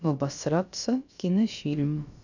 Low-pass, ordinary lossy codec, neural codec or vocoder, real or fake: 7.2 kHz; none; codec, 16 kHz, about 1 kbps, DyCAST, with the encoder's durations; fake